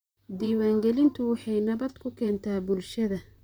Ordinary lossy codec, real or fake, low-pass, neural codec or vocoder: none; fake; none; vocoder, 44.1 kHz, 128 mel bands, Pupu-Vocoder